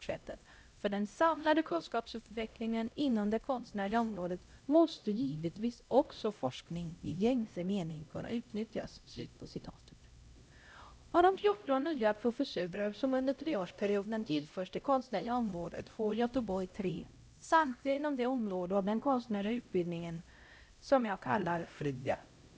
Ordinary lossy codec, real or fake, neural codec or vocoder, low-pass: none; fake; codec, 16 kHz, 0.5 kbps, X-Codec, HuBERT features, trained on LibriSpeech; none